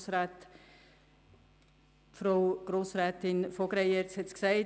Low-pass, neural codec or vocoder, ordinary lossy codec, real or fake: none; none; none; real